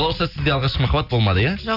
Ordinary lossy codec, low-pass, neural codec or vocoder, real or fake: none; 5.4 kHz; none; real